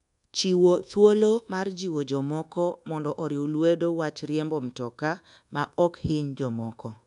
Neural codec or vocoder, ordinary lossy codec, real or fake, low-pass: codec, 24 kHz, 1.2 kbps, DualCodec; MP3, 96 kbps; fake; 10.8 kHz